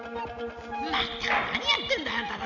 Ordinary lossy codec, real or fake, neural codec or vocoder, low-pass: none; real; none; 7.2 kHz